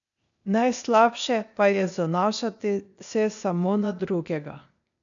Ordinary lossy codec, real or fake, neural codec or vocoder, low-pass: none; fake; codec, 16 kHz, 0.8 kbps, ZipCodec; 7.2 kHz